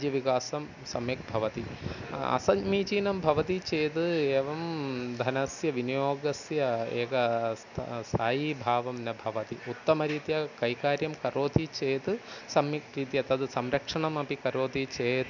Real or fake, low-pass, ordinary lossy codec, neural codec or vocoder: real; 7.2 kHz; none; none